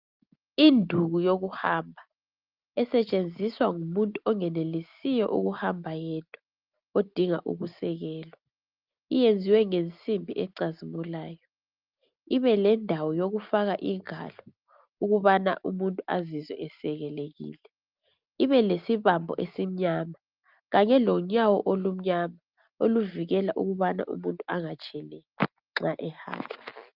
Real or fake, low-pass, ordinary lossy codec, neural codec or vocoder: real; 5.4 kHz; Opus, 24 kbps; none